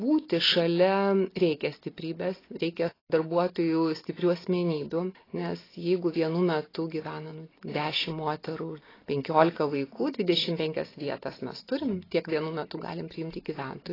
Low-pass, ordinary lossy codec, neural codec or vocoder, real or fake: 5.4 kHz; AAC, 24 kbps; none; real